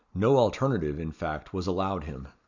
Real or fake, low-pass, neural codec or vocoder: real; 7.2 kHz; none